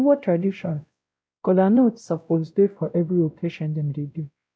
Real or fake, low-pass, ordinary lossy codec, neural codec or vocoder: fake; none; none; codec, 16 kHz, 0.5 kbps, X-Codec, WavLM features, trained on Multilingual LibriSpeech